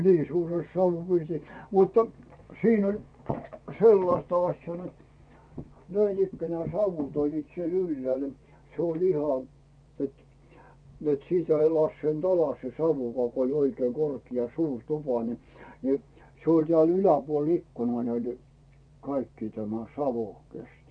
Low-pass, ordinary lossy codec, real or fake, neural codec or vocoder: 9.9 kHz; none; fake; vocoder, 24 kHz, 100 mel bands, Vocos